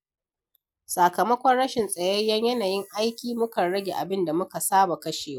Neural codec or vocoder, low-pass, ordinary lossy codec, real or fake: none; none; none; real